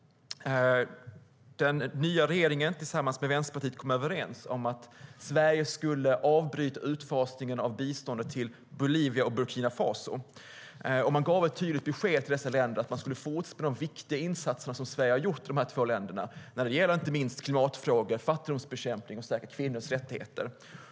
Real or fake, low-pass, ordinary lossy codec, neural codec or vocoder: real; none; none; none